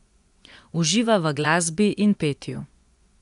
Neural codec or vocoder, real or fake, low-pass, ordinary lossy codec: vocoder, 24 kHz, 100 mel bands, Vocos; fake; 10.8 kHz; MP3, 96 kbps